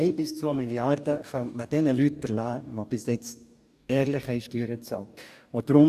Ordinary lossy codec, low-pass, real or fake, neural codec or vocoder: none; 14.4 kHz; fake; codec, 44.1 kHz, 2.6 kbps, DAC